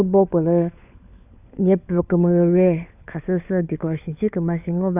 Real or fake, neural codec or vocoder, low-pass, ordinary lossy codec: fake; codec, 16 kHz, 4 kbps, FunCodec, trained on LibriTTS, 50 frames a second; 3.6 kHz; none